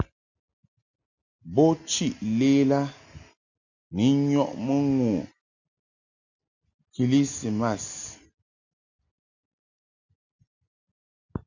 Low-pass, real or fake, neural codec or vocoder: 7.2 kHz; real; none